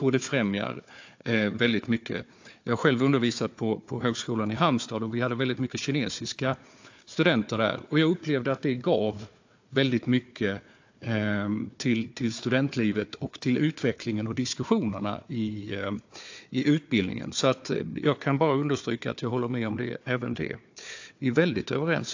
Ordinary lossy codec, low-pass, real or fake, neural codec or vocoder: AAC, 48 kbps; 7.2 kHz; fake; codec, 16 kHz, 4 kbps, FunCodec, trained on Chinese and English, 50 frames a second